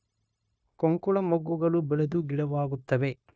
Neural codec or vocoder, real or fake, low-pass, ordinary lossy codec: codec, 16 kHz, 0.9 kbps, LongCat-Audio-Codec; fake; none; none